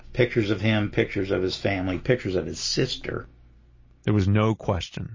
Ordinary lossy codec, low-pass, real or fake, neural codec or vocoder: MP3, 32 kbps; 7.2 kHz; real; none